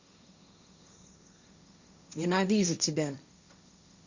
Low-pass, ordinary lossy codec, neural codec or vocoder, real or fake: 7.2 kHz; Opus, 64 kbps; codec, 16 kHz, 1.1 kbps, Voila-Tokenizer; fake